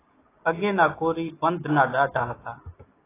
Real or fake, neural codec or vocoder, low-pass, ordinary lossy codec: real; none; 3.6 kHz; AAC, 16 kbps